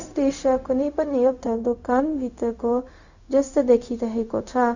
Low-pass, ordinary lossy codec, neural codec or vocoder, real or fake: 7.2 kHz; none; codec, 16 kHz, 0.4 kbps, LongCat-Audio-Codec; fake